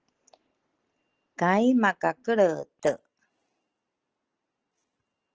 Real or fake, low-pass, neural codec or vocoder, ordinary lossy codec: real; 7.2 kHz; none; Opus, 16 kbps